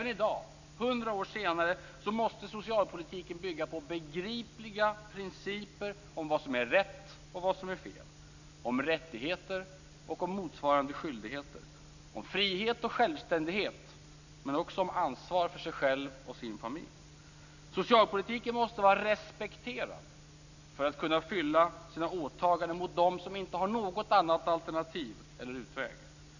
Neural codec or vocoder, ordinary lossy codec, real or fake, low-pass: none; none; real; 7.2 kHz